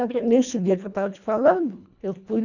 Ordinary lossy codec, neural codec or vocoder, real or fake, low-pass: none; codec, 24 kHz, 1.5 kbps, HILCodec; fake; 7.2 kHz